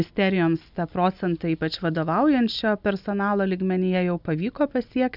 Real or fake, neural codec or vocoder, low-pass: real; none; 5.4 kHz